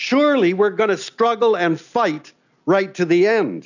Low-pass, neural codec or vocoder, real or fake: 7.2 kHz; none; real